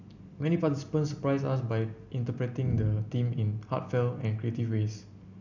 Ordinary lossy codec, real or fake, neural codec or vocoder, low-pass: none; real; none; 7.2 kHz